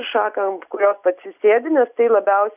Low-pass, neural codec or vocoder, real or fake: 3.6 kHz; none; real